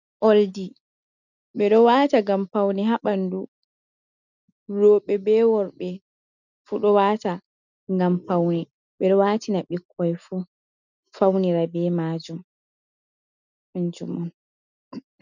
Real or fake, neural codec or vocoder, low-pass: real; none; 7.2 kHz